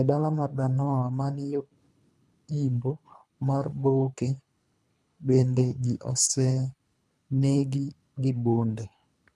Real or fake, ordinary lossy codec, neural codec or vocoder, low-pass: fake; none; codec, 24 kHz, 3 kbps, HILCodec; none